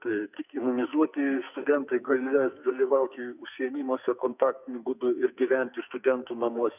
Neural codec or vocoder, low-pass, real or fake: codec, 44.1 kHz, 2.6 kbps, SNAC; 3.6 kHz; fake